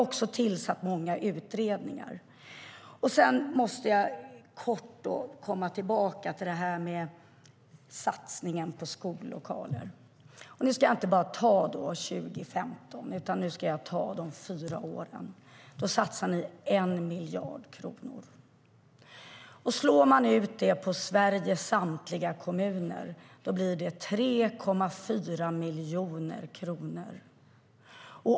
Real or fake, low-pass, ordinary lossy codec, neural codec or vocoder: real; none; none; none